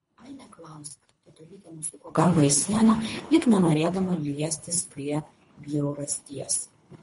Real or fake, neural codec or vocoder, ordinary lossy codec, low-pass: fake; codec, 24 kHz, 3 kbps, HILCodec; MP3, 48 kbps; 10.8 kHz